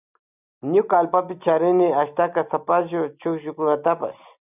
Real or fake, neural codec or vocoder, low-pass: real; none; 3.6 kHz